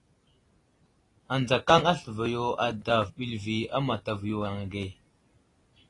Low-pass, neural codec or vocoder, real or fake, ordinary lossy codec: 10.8 kHz; none; real; AAC, 32 kbps